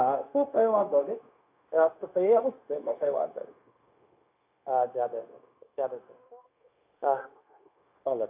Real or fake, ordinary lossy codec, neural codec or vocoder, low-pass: fake; AAC, 32 kbps; codec, 16 kHz, 0.9 kbps, LongCat-Audio-Codec; 3.6 kHz